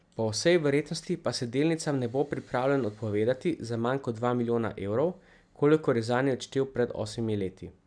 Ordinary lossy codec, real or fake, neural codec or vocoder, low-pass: MP3, 96 kbps; real; none; 9.9 kHz